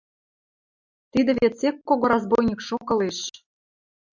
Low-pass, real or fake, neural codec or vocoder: 7.2 kHz; real; none